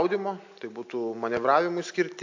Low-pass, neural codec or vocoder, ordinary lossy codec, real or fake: 7.2 kHz; none; MP3, 48 kbps; real